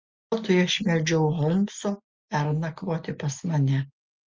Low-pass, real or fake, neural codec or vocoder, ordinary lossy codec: 7.2 kHz; real; none; Opus, 32 kbps